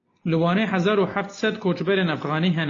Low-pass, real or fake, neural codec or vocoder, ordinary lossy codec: 7.2 kHz; real; none; AAC, 32 kbps